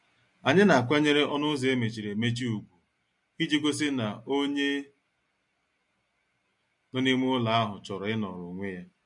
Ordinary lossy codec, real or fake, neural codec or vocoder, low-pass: MP3, 48 kbps; real; none; 10.8 kHz